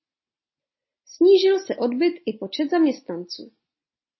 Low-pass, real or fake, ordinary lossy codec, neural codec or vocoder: 7.2 kHz; real; MP3, 24 kbps; none